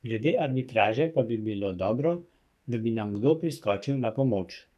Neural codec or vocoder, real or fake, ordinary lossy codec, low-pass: codec, 44.1 kHz, 2.6 kbps, SNAC; fake; none; 14.4 kHz